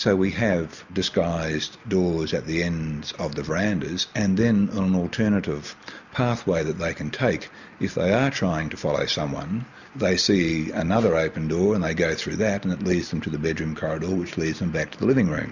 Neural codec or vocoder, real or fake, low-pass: none; real; 7.2 kHz